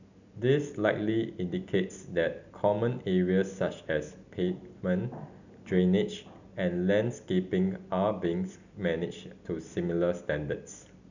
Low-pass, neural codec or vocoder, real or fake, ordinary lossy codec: 7.2 kHz; none; real; none